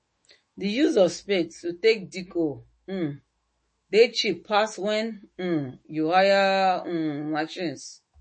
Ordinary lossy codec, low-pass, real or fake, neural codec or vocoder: MP3, 32 kbps; 9.9 kHz; fake; autoencoder, 48 kHz, 128 numbers a frame, DAC-VAE, trained on Japanese speech